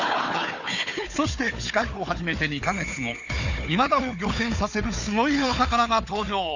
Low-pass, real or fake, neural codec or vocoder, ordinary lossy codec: 7.2 kHz; fake; codec, 16 kHz, 4 kbps, FunCodec, trained on Chinese and English, 50 frames a second; none